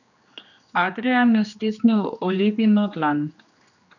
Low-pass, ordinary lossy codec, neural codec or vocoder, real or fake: 7.2 kHz; none; codec, 16 kHz, 2 kbps, X-Codec, HuBERT features, trained on general audio; fake